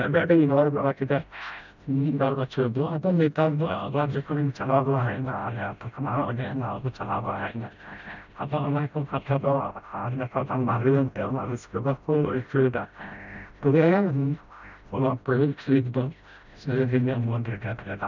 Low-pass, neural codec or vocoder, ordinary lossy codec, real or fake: 7.2 kHz; codec, 16 kHz, 0.5 kbps, FreqCodec, smaller model; none; fake